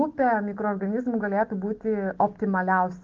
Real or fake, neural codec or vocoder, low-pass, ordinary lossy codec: real; none; 7.2 kHz; Opus, 16 kbps